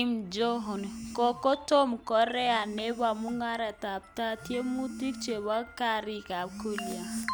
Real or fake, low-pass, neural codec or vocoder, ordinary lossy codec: real; none; none; none